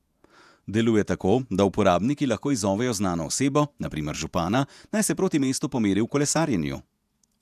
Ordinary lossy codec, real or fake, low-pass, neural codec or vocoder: none; fake; 14.4 kHz; vocoder, 48 kHz, 128 mel bands, Vocos